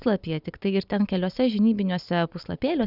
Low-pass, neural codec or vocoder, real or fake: 5.4 kHz; none; real